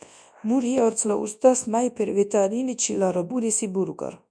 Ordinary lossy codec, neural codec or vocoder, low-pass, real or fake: MP3, 96 kbps; codec, 24 kHz, 0.9 kbps, WavTokenizer, large speech release; 9.9 kHz; fake